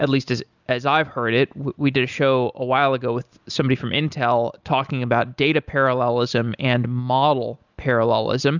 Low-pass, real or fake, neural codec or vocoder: 7.2 kHz; real; none